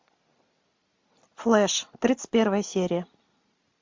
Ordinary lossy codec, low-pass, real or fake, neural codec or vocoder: MP3, 48 kbps; 7.2 kHz; real; none